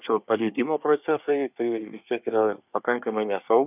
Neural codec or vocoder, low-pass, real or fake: codec, 24 kHz, 1 kbps, SNAC; 3.6 kHz; fake